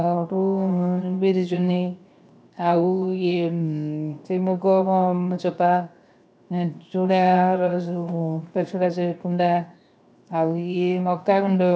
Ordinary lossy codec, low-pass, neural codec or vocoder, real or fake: none; none; codec, 16 kHz, 0.7 kbps, FocalCodec; fake